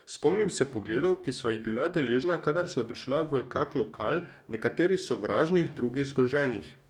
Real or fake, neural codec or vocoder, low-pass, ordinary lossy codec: fake; codec, 44.1 kHz, 2.6 kbps, DAC; 19.8 kHz; none